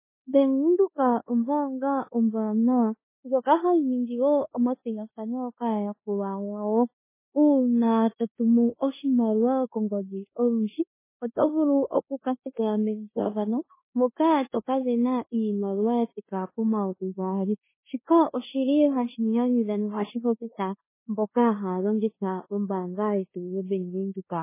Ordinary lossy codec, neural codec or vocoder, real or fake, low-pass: MP3, 16 kbps; codec, 16 kHz in and 24 kHz out, 0.9 kbps, LongCat-Audio-Codec, four codebook decoder; fake; 3.6 kHz